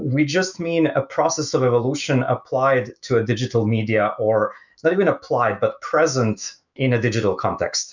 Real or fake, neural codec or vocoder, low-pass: fake; autoencoder, 48 kHz, 128 numbers a frame, DAC-VAE, trained on Japanese speech; 7.2 kHz